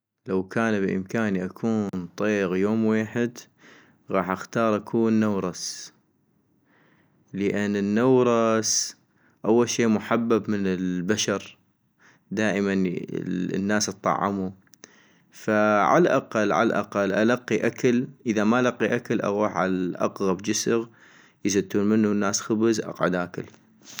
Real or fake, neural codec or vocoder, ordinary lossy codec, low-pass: real; none; none; none